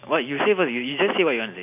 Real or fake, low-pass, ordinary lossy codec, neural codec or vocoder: real; 3.6 kHz; none; none